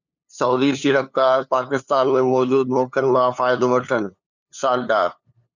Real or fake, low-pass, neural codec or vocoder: fake; 7.2 kHz; codec, 16 kHz, 2 kbps, FunCodec, trained on LibriTTS, 25 frames a second